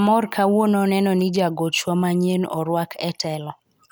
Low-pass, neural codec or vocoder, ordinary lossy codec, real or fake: none; none; none; real